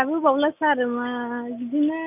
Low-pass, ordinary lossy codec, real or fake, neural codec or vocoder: 3.6 kHz; none; real; none